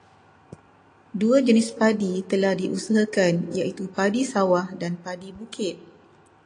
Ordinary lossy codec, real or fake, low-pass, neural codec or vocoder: AAC, 48 kbps; real; 9.9 kHz; none